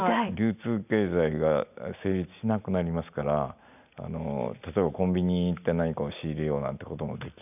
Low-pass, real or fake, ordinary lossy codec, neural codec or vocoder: 3.6 kHz; real; none; none